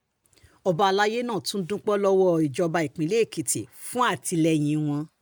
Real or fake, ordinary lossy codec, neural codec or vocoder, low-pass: real; none; none; none